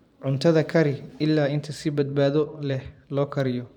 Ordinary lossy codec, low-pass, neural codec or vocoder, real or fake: none; 19.8 kHz; vocoder, 44.1 kHz, 128 mel bands every 512 samples, BigVGAN v2; fake